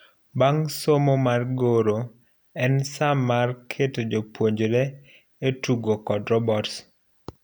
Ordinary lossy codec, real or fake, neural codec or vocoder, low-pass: none; real; none; none